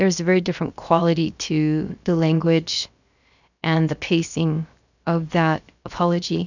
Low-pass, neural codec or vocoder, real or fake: 7.2 kHz; codec, 16 kHz, 0.7 kbps, FocalCodec; fake